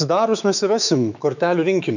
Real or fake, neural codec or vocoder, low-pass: fake; autoencoder, 48 kHz, 128 numbers a frame, DAC-VAE, trained on Japanese speech; 7.2 kHz